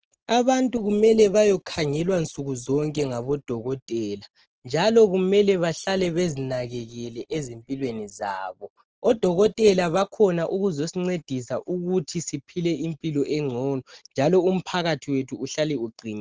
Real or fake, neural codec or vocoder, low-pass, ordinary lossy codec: real; none; 7.2 kHz; Opus, 16 kbps